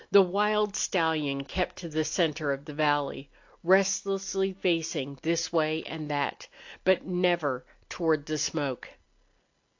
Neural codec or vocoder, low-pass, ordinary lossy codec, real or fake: none; 7.2 kHz; AAC, 48 kbps; real